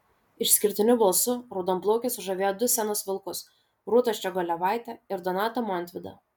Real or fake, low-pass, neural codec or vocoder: real; 19.8 kHz; none